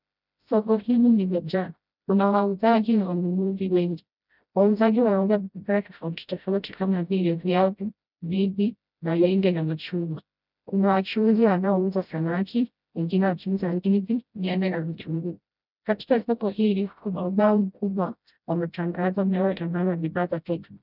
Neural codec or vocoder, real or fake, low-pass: codec, 16 kHz, 0.5 kbps, FreqCodec, smaller model; fake; 5.4 kHz